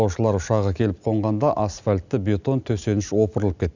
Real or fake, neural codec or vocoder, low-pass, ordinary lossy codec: real; none; 7.2 kHz; none